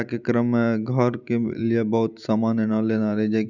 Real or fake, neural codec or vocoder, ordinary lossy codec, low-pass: real; none; none; 7.2 kHz